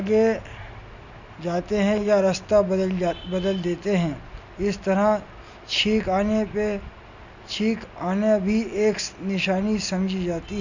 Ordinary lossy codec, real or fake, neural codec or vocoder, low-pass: none; real; none; 7.2 kHz